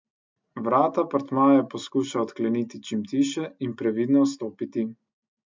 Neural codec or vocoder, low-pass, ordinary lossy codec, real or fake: none; 7.2 kHz; MP3, 64 kbps; real